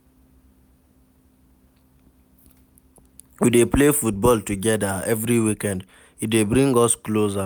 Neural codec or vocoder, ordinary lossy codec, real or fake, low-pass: none; none; real; none